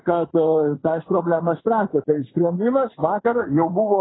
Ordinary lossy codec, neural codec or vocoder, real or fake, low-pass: AAC, 16 kbps; vocoder, 44.1 kHz, 80 mel bands, Vocos; fake; 7.2 kHz